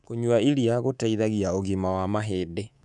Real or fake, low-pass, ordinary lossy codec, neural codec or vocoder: fake; 10.8 kHz; none; codec, 24 kHz, 3.1 kbps, DualCodec